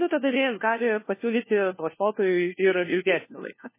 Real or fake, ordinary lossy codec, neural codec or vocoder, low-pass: fake; MP3, 16 kbps; codec, 16 kHz, 1 kbps, FunCodec, trained on LibriTTS, 50 frames a second; 3.6 kHz